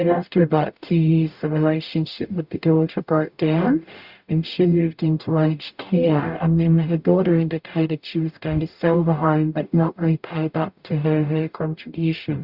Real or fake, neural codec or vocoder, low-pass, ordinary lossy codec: fake; codec, 44.1 kHz, 0.9 kbps, DAC; 5.4 kHz; Opus, 64 kbps